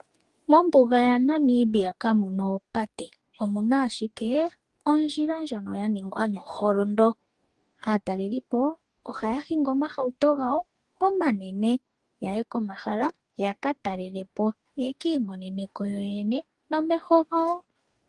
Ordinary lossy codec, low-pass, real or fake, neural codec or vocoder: Opus, 24 kbps; 10.8 kHz; fake; codec, 44.1 kHz, 2.6 kbps, DAC